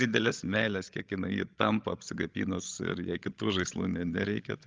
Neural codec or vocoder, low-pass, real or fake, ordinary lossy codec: codec, 16 kHz, 16 kbps, FreqCodec, larger model; 7.2 kHz; fake; Opus, 32 kbps